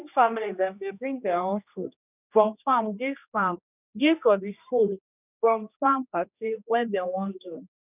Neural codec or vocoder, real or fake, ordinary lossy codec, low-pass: codec, 16 kHz, 1 kbps, X-Codec, HuBERT features, trained on general audio; fake; none; 3.6 kHz